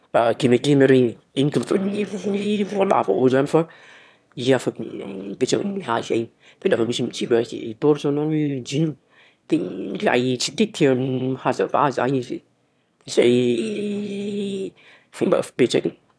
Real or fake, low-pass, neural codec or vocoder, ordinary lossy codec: fake; none; autoencoder, 22.05 kHz, a latent of 192 numbers a frame, VITS, trained on one speaker; none